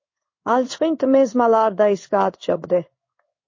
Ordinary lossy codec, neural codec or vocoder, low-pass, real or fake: MP3, 32 kbps; codec, 16 kHz in and 24 kHz out, 1 kbps, XY-Tokenizer; 7.2 kHz; fake